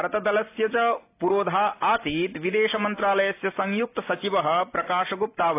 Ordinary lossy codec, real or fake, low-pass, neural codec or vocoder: AAC, 24 kbps; real; 3.6 kHz; none